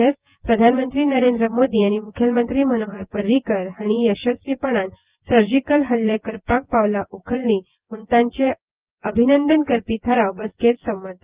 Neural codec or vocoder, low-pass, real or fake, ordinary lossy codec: vocoder, 24 kHz, 100 mel bands, Vocos; 3.6 kHz; fake; Opus, 32 kbps